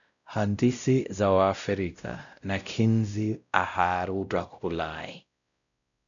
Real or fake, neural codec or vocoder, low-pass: fake; codec, 16 kHz, 0.5 kbps, X-Codec, WavLM features, trained on Multilingual LibriSpeech; 7.2 kHz